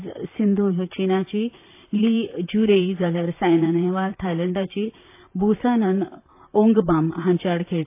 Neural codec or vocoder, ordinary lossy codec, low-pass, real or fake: vocoder, 44.1 kHz, 128 mel bands, Pupu-Vocoder; AAC, 24 kbps; 3.6 kHz; fake